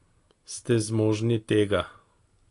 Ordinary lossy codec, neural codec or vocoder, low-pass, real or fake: AAC, 48 kbps; none; 10.8 kHz; real